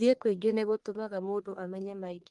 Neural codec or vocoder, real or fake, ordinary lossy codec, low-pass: codec, 44.1 kHz, 1.7 kbps, Pupu-Codec; fake; Opus, 24 kbps; 10.8 kHz